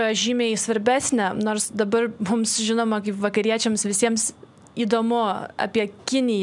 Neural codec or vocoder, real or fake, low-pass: none; real; 10.8 kHz